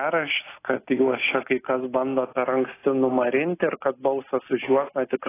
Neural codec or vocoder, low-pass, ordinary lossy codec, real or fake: none; 3.6 kHz; AAC, 16 kbps; real